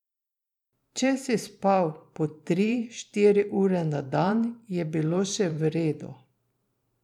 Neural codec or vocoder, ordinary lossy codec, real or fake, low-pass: vocoder, 44.1 kHz, 128 mel bands every 512 samples, BigVGAN v2; none; fake; 19.8 kHz